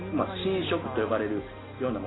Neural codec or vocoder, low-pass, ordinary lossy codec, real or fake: none; 7.2 kHz; AAC, 16 kbps; real